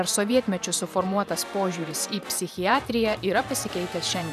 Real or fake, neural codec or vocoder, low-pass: fake; vocoder, 44.1 kHz, 128 mel bands every 512 samples, BigVGAN v2; 14.4 kHz